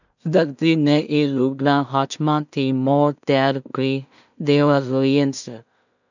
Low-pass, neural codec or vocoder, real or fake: 7.2 kHz; codec, 16 kHz in and 24 kHz out, 0.4 kbps, LongCat-Audio-Codec, two codebook decoder; fake